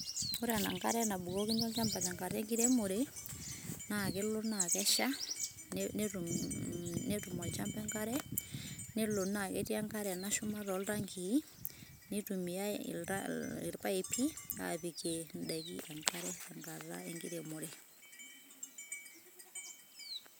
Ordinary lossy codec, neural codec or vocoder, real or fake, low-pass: none; none; real; none